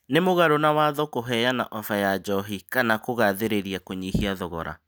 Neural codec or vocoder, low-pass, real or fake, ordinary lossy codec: none; none; real; none